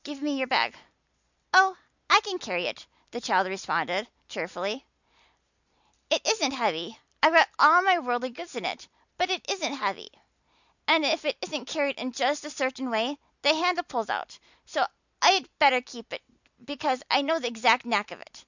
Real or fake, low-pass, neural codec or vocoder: real; 7.2 kHz; none